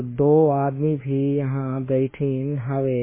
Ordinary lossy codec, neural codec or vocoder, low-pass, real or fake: MP3, 16 kbps; autoencoder, 48 kHz, 32 numbers a frame, DAC-VAE, trained on Japanese speech; 3.6 kHz; fake